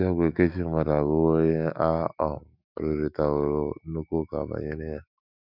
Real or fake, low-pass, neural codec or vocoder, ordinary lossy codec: fake; 5.4 kHz; autoencoder, 48 kHz, 128 numbers a frame, DAC-VAE, trained on Japanese speech; none